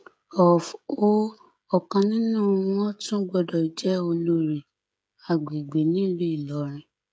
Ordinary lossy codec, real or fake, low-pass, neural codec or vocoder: none; fake; none; codec, 16 kHz, 16 kbps, FreqCodec, smaller model